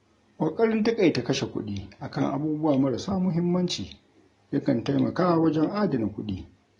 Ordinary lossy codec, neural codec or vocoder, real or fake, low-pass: AAC, 32 kbps; none; real; 10.8 kHz